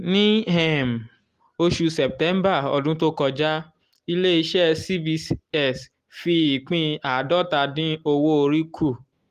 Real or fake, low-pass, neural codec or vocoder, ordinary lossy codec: real; 14.4 kHz; none; Opus, 24 kbps